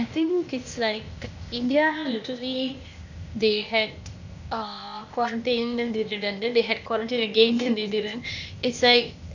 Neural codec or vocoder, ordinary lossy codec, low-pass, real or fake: codec, 16 kHz, 0.8 kbps, ZipCodec; none; 7.2 kHz; fake